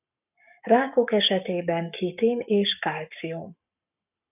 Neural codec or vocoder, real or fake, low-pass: codec, 44.1 kHz, 7.8 kbps, Pupu-Codec; fake; 3.6 kHz